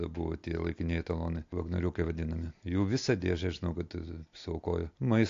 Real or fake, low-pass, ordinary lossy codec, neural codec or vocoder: real; 7.2 kHz; AAC, 48 kbps; none